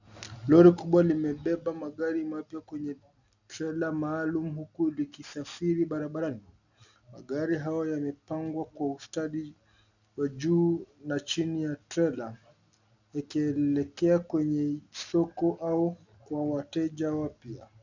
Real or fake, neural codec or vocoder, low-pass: real; none; 7.2 kHz